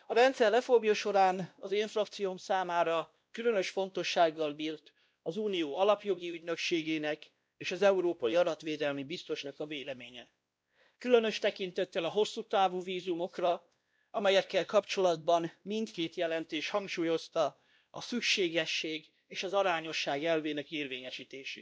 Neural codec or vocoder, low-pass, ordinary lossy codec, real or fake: codec, 16 kHz, 1 kbps, X-Codec, WavLM features, trained on Multilingual LibriSpeech; none; none; fake